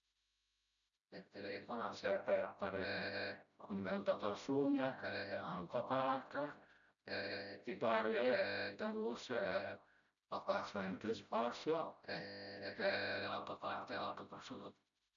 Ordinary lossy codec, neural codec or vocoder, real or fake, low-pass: none; codec, 16 kHz, 0.5 kbps, FreqCodec, smaller model; fake; 7.2 kHz